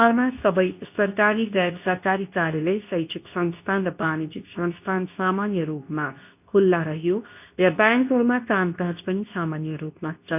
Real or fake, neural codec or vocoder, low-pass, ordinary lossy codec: fake; codec, 24 kHz, 0.9 kbps, WavTokenizer, medium speech release version 2; 3.6 kHz; none